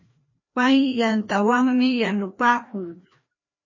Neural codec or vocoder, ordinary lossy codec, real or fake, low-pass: codec, 16 kHz, 1 kbps, FreqCodec, larger model; MP3, 32 kbps; fake; 7.2 kHz